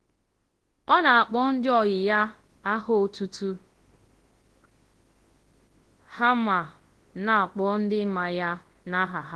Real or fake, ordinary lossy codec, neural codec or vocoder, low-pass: fake; Opus, 16 kbps; codec, 24 kHz, 0.9 kbps, WavTokenizer, large speech release; 10.8 kHz